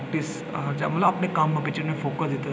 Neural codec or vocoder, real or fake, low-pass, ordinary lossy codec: none; real; none; none